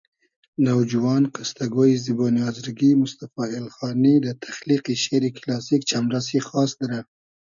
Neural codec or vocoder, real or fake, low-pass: none; real; 7.2 kHz